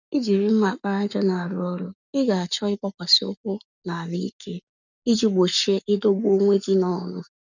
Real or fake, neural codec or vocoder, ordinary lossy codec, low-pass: fake; codec, 44.1 kHz, 7.8 kbps, Pupu-Codec; none; 7.2 kHz